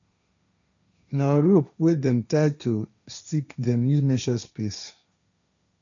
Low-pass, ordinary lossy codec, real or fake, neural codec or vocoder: 7.2 kHz; none; fake; codec, 16 kHz, 1.1 kbps, Voila-Tokenizer